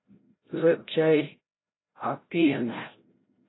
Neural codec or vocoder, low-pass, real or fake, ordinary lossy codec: codec, 16 kHz, 0.5 kbps, FreqCodec, larger model; 7.2 kHz; fake; AAC, 16 kbps